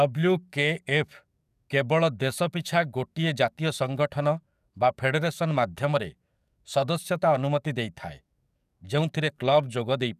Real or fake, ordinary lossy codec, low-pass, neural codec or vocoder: fake; none; 14.4 kHz; codec, 44.1 kHz, 7.8 kbps, DAC